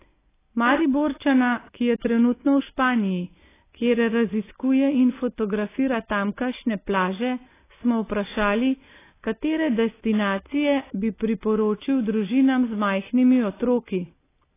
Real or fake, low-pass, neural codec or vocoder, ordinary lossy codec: real; 3.6 kHz; none; AAC, 16 kbps